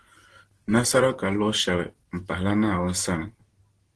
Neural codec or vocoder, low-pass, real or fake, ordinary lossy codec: none; 10.8 kHz; real; Opus, 16 kbps